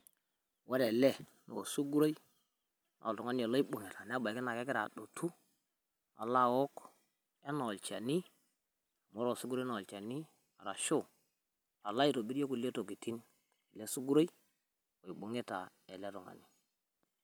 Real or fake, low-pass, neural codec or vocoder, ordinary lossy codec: real; none; none; none